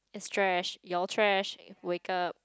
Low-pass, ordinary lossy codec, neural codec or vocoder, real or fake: none; none; none; real